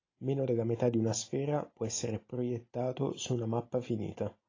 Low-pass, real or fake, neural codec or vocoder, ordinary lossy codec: 7.2 kHz; real; none; AAC, 32 kbps